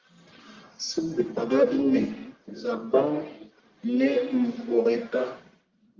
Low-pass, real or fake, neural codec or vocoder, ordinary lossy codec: 7.2 kHz; fake; codec, 44.1 kHz, 1.7 kbps, Pupu-Codec; Opus, 32 kbps